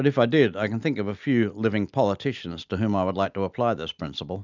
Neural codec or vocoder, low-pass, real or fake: none; 7.2 kHz; real